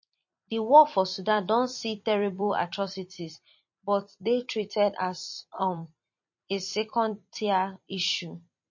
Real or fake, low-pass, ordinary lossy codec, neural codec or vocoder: fake; 7.2 kHz; MP3, 32 kbps; vocoder, 44.1 kHz, 128 mel bands every 256 samples, BigVGAN v2